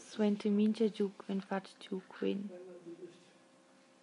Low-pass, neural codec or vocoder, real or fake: 10.8 kHz; none; real